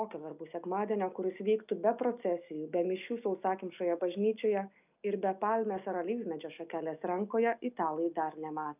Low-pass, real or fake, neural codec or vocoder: 3.6 kHz; real; none